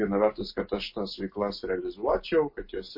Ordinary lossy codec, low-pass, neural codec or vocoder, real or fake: MP3, 32 kbps; 5.4 kHz; none; real